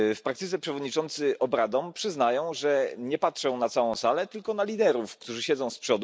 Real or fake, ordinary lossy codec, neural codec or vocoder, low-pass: real; none; none; none